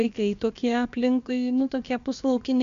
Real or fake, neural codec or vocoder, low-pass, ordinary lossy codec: fake; codec, 16 kHz, about 1 kbps, DyCAST, with the encoder's durations; 7.2 kHz; MP3, 64 kbps